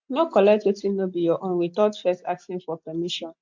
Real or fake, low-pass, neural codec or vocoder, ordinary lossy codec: real; 7.2 kHz; none; MP3, 48 kbps